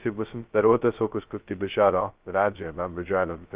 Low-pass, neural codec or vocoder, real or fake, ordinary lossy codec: 3.6 kHz; codec, 16 kHz, 0.2 kbps, FocalCodec; fake; Opus, 32 kbps